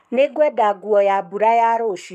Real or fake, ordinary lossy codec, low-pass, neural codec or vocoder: fake; none; 14.4 kHz; codec, 44.1 kHz, 7.8 kbps, Pupu-Codec